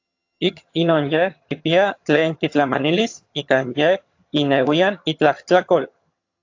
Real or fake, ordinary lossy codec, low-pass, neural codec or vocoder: fake; AAC, 48 kbps; 7.2 kHz; vocoder, 22.05 kHz, 80 mel bands, HiFi-GAN